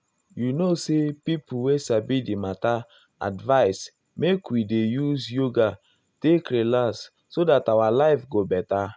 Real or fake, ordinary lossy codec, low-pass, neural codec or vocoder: real; none; none; none